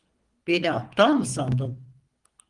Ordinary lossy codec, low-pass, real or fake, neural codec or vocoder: Opus, 24 kbps; 10.8 kHz; fake; codec, 24 kHz, 3 kbps, HILCodec